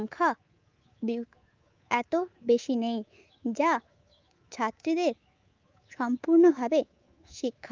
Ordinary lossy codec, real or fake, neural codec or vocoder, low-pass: Opus, 24 kbps; fake; codec, 24 kHz, 3.1 kbps, DualCodec; 7.2 kHz